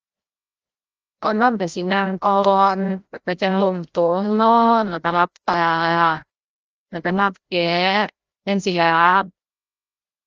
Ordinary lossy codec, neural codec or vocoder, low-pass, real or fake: Opus, 24 kbps; codec, 16 kHz, 0.5 kbps, FreqCodec, larger model; 7.2 kHz; fake